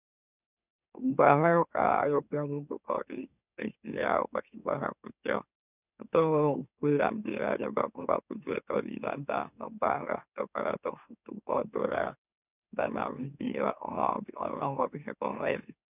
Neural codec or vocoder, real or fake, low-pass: autoencoder, 44.1 kHz, a latent of 192 numbers a frame, MeloTTS; fake; 3.6 kHz